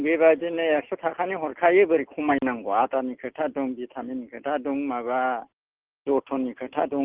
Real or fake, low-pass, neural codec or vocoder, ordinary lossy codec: real; 3.6 kHz; none; Opus, 16 kbps